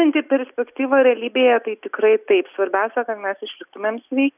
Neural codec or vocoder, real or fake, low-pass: none; real; 3.6 kHz